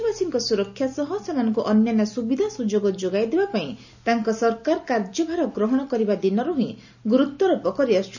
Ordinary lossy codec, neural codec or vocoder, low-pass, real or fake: none; none; 7.2 kHz; real